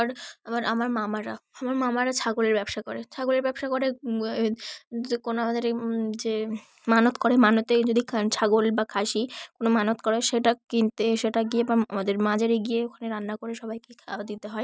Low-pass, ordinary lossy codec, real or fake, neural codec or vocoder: none; none; real; none